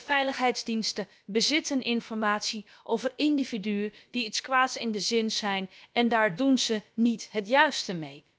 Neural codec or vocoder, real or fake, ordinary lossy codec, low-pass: codec, 16 kHz, about 1 kbps, DyCAST, with the encoder's durations; fake; none; none